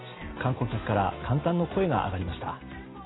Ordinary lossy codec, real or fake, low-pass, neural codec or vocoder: AAC, 16 kbps; real; 7.2 kHz; none